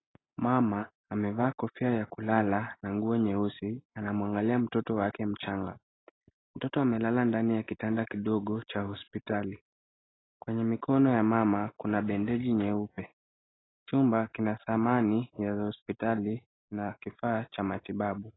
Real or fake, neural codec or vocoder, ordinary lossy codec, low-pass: real; none; AAC, 16 kbps; 7.2 kHz